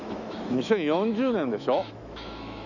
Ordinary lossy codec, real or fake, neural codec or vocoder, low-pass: none; fake; autoencoder, 48 kHz, 128 numbers a frame, DAC-VAE, trained on Japanese speech; 7.2 kHz